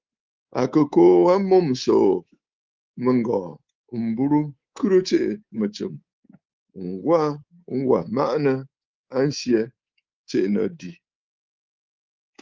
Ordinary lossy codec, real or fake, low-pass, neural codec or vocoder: Opus, 32 kbps; fake; 7.2 kHz; codec, 24 kHz, 3.1 kbps, DualCodec